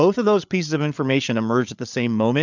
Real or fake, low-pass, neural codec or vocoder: fake; 7.2 kHz; codec, 16 kHz, 8 kbps, FreqCodec, larger model